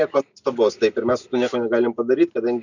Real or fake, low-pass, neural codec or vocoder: real; 7.2 kHz; none